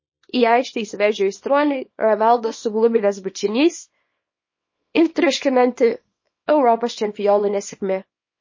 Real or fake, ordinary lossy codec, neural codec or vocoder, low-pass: fake; MP3, 32 kbps; codec, 24 kHz, 0.9 kbps, WavTokenizer, small release; 7.2 kHz